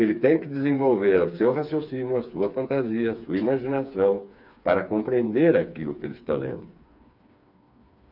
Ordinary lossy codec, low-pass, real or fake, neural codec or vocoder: none; 5.4 kHz; fake; codec, 16 kHz, 4 kbps, FreqCodec, smaller model